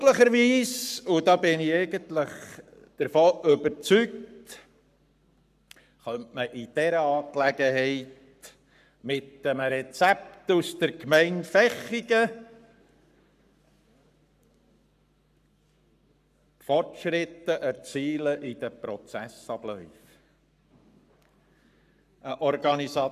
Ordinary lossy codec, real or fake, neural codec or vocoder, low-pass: none; fake; codec, 44.1 kHz, 7.8 kbps, Pupu-Codec; 14.4 kHz